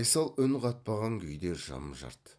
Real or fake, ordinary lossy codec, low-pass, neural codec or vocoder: real; none; none; none